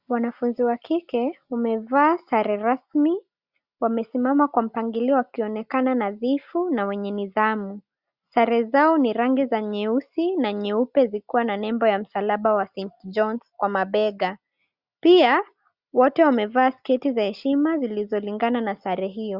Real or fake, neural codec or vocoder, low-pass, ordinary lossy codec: real; none; 5.4 kHz; Opus, 64 kbps